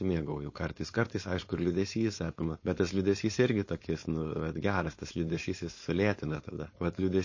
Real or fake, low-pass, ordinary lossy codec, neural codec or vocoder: fake; 7.2 kHz; MP3, 32 kbps; codec, 16 kHz, 4.8 kbps, FACodec